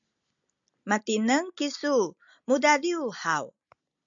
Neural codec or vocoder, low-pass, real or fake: none; 7.2 kHz; real